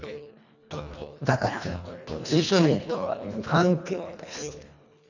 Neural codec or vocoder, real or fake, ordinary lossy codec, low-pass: codec, 24 kHz, 1.5 kbps, HILCodec; fake; none; 7.2 kHz